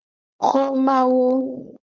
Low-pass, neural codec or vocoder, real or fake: 7.2 kHz; codec, 16 kHz, 4.8 kbps, FACodec; fake